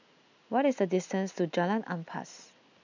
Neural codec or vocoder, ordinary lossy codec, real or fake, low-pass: none; none; real; 7.2 kHz